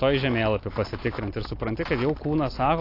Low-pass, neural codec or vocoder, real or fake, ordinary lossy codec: 5.4 kHz; none; real; AAC, 32 kbps